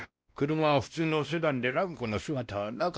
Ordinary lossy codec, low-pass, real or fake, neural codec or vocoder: none; none; fake; codec, 16 kHz, 1 kbps, X-Codec, WavLM features, trained on Multilingual LibriSpeech